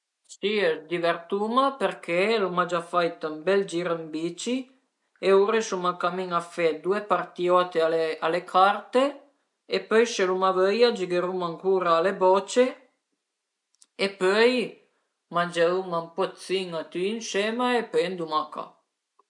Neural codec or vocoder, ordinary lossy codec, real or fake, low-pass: none; MP3, 64 kbps; real; 10.8 kHz